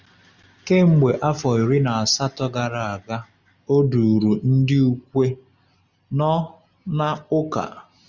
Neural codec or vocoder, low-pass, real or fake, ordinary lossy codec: none; 7.2 kHz; real; Opus, 64 kbps